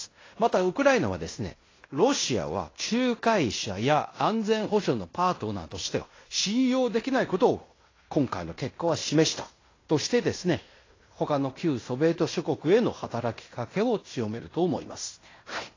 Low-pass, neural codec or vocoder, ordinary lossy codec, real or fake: 7.2 kHz; codec, 16 kHz in and 24 kHz out, 0.9 kbps, LongCat-Audio-Codec, fine tuned four codebook decoder; AAC, 32 kbps; fake